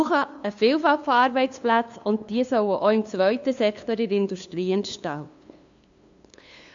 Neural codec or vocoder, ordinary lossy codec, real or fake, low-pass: codec, 16 kHz, 2 kbps, FunCodec, trained on LibriTTS, 25 frames a second; AAC, 64 kbps; fake; 7.2 kHz